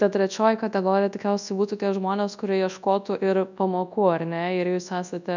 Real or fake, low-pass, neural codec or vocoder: fake; 7.2 kHz; codec, 24 kHz, 0.9 kbps, WavTokenizer, large speech release